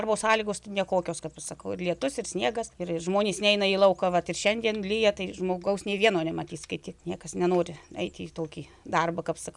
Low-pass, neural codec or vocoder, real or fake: 10.8 kHz; none; real